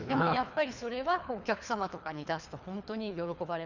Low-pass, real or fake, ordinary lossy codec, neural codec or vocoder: 7.2 kHz; fake; none; codec, 24 kHz, 3 kbps, HILCodec